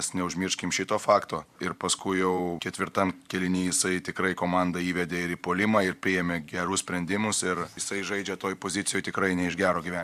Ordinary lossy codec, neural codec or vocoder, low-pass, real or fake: AAC, 96 kbps; vocoder, 44.1 kHz, 128 mel bands every 512 samples, BigVGAN v2; 14.4 kHz; fake